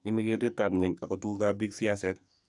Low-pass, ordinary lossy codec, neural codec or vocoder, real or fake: 10.8 kHz; none; codec, 44.1 kHz, 2.6 kbps, SNAC; fake